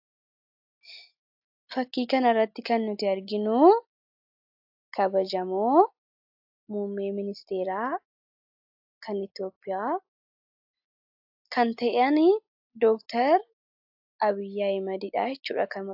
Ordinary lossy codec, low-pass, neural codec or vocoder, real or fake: AAC, 48 kbps; 5.4 kHz; none; real